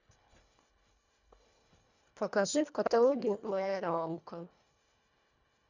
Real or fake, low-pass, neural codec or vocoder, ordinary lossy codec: fake; 7.2 kHz; codec, 24 kHz, 1.5 kbps, HILCodec; none